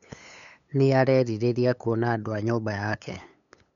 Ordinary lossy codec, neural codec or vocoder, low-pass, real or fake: none; codec, 16 kHz, 8 kbps, FunCodec, trained on LibriTTS, 25 frames a second; 7.2 kHz; fake